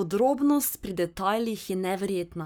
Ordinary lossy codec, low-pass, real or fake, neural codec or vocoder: none; none; fake; codec, 44.1 kHz, 7.8 kbps, Pupu-Codec